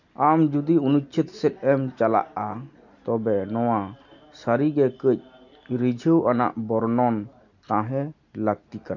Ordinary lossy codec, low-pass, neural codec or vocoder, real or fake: none; 7.2 kHz; none; real